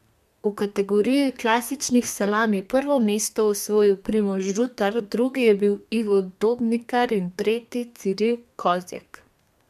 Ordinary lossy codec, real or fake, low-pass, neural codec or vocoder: MP3, 96 kbps; fake; 14.4 kHz; codec, 32 kHz, 1.9 kbps, SNAC